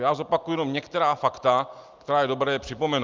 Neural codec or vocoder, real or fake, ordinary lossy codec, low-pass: none; real; Opus, 24 kbps; 7.2 kHz